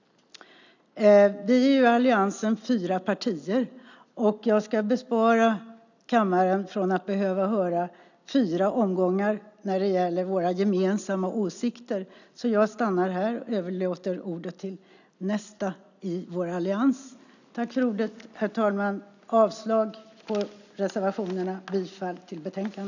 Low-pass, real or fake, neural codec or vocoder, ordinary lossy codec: 7.2 kHz; real; none; none